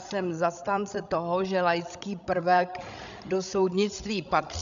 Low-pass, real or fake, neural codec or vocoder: 7.2 kHz; fake; codec, 16 kHz, 16 kbps, FreqCodec, larger model